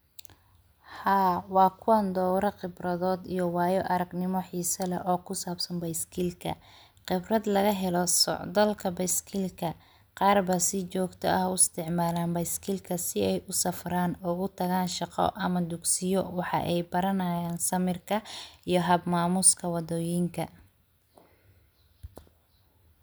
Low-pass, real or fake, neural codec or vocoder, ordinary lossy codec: none; real; none; none